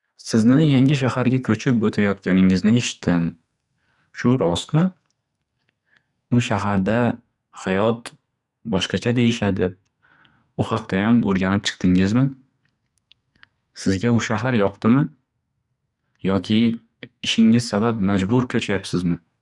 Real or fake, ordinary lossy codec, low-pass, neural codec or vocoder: fake; none; 10.8 kHz; codec, 44.1 kHz, 2.6 kbps, SNAC